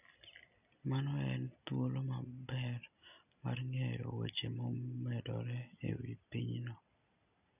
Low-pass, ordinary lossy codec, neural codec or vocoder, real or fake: 3.6 kHz; none; none; real